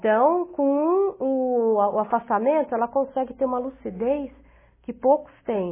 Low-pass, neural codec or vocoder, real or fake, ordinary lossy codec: 3.6 kHz; none; real; MP3, 16 kbps